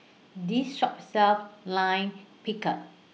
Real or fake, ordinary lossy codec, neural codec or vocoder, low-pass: real; none; none; none